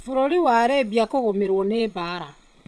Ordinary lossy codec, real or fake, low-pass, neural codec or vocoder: none; fake; none; vocoder, 22.05 kHz, 80 mel bands, Vocos